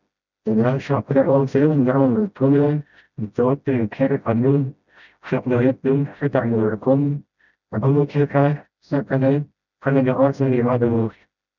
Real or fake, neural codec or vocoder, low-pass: fake; codec, 16 kHz, 0.5 kbps, FreqCodec, smaller model; 7.2 kHz